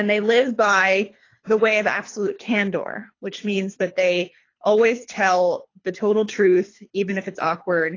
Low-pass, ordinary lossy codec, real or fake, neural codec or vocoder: 7.2 kHz; AAC, 32 kbps; fake; codec, 24 kHz, 3 kbps, HILCodec